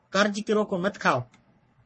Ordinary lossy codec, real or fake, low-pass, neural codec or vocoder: MP3, 32 kbps; fake; 10.8 kHz; codec, 44.1 kHz, 3.4 kbps, Pupu-Codec